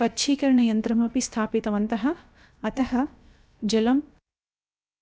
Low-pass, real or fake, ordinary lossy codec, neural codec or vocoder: none; fake; none; codec, 16 kHz, about 1 kbps, DyCAST, with the encoder's durations